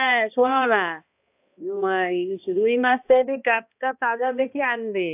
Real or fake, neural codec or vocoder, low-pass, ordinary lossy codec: fake; codec, 16 kHz, 1 kbps, X-Codec, HuBERT features, trained on balanced general audio; 3.6 kHz; AAC, 32 kbps